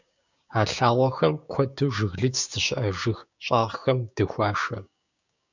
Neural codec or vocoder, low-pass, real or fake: codec, 16 kHz, 6 kbps, DAC; 7.2 kHz; fake